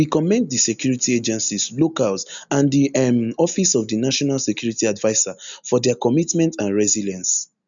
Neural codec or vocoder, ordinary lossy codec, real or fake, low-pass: none; none; real; 7.2 kHz